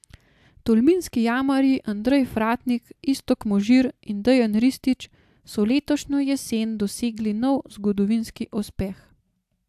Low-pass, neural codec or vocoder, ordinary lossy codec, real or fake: 14.4 kHz; none; AAC, 96 kbps; real